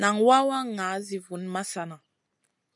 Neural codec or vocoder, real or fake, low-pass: none; real; 10.8 kHz